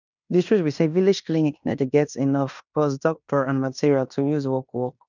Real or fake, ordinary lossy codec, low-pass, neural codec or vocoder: fake; none; 7.2 kHz; codec, 16 kHz in and 24 kHz out, 0.9 kbps, LongCat-Audio-Codec, fine tuned four codebook decoder